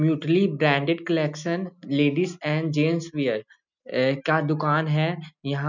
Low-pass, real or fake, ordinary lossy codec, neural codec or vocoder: 7.2 kHz; real; none; none